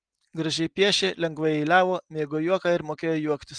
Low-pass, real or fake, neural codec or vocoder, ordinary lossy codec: 9.9 kHz; real; none; Opus, 24 kbps